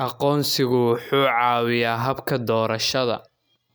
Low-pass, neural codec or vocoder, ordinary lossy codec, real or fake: none; none; none; real